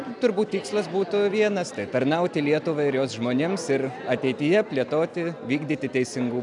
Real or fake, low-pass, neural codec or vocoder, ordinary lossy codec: real; 10.8 kHz; none; MP3, 96 kbps